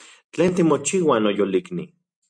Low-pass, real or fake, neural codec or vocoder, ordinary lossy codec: 9.9 kHz; real; none; MP3, 64 kbps